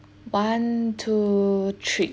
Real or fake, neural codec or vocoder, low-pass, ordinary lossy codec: real; none; none; none